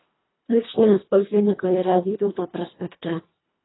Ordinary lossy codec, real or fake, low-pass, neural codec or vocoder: AAC, 16 kbps; fake; 7.2 kHz; codec, 24 kHz, 1.5 kbps, HILCodec